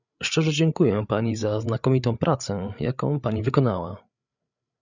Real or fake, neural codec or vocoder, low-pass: fake; codec, 16 kHz, 8 kbps, FreqCodec, larger model; 7.2 kHz